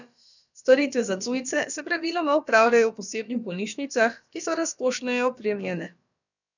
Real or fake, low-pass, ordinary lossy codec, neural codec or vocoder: fake; 7.2 kHz; none; codec, 16 kHz, about 1 kbps, DyCAST, with the encoder's durations